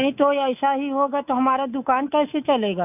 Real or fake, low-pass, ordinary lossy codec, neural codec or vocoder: fake; 3.6 kHz; AAC, 32 kbps; codec, 24 kHz, 3.1 kbps, DualCodec